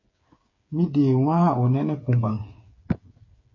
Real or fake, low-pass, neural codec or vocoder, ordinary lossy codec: fake; 7.2 kHz; codec, 16 kHz, 8 kbps, FreqCodec, smaller model; MP3, 32 kbps